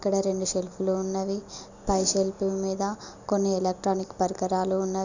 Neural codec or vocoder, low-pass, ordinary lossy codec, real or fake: none; 7.2 kHz; none; real